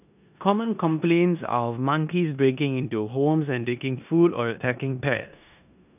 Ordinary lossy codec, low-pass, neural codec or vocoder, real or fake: none; 3.6 kHz; codec, 16 kHz in and 24 kHz out, 0.9 kbps, LongCat-Audio-Codec, four codebook decoder; fake